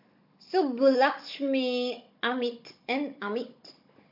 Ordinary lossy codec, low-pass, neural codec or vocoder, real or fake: MP3, 32 kbps; 5.4 kHz; codec, 16 kHz, 16 kbps, FunCodec, trained on Chinese and English, 50 frames a second; fake